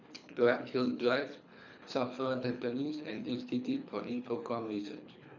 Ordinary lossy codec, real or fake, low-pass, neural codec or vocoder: none; fake; 7.2 kHz; codec, 24 kHz, 3 kbps, HILCodec